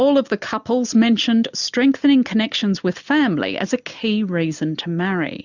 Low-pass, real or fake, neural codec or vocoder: 7.2 kHz; real; none